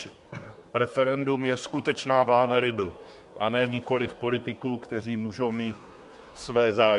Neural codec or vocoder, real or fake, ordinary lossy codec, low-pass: codec, 24 kHz, 1 kbps, SNAC; fake; MP3, 64 kbps; 10.8 kHz